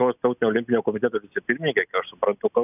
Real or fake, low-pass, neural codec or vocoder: real; 3.6 kHz; none